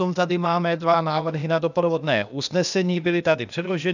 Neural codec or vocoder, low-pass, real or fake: codec, 16 kHz, 0.8 kbps, ZipCodec; 7.2 kHz; fake